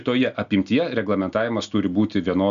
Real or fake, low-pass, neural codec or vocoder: real; 7.2 kHz; none